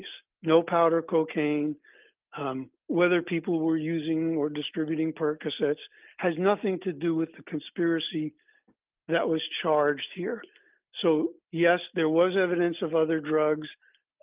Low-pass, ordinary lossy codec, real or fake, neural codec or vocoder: 3.6 kHz; Opus, 32 kbps; real; none